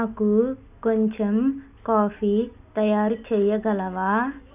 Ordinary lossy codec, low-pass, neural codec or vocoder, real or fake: none; 3.6 kHz; none; real